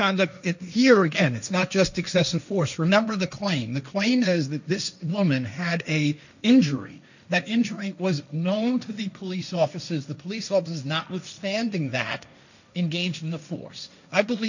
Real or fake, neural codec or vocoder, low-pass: fake; codec, 16 kHz, 1.1 kbps, Voila-Tokenizer; 7.2 kHz